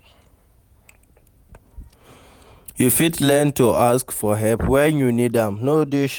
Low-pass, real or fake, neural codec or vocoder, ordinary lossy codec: none; fake; vocoder, 48 kHz, 128 mel bands, Vocos; none